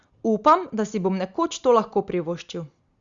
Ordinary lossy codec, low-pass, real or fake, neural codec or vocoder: Opus, 64 kbps; 7.2 kHz; real; none